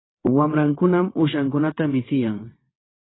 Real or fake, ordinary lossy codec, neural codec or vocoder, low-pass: fake; AAC, 16 kbps; vocoder, 22.05 kHz, 80 mel bands, WaveNeXt; 7.2 kHz